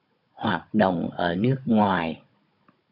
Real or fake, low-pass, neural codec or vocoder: fake; 5.4 kHz; codec, 16 kHz, 16 kbps, FunCodec, trained on Chinese and English, 50 frames a second